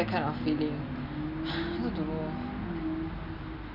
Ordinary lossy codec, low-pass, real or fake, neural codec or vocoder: none; 5.4 kHz; real; none